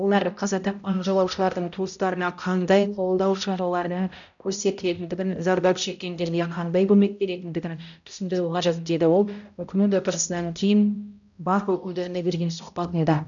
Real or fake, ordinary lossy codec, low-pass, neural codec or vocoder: fake; none; 7.2 kHz; codec, 16 kHz, 0.5 kbps, X-Codec, HuBERT features, trained on balanced general audio